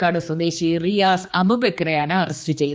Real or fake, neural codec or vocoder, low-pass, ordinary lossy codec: fake; codec, 16 kHz, 2 kbps, X-Codec, HuBERT features, trained on general audio; none; none